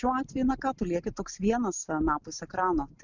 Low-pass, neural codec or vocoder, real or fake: 7.2 kHz; none; real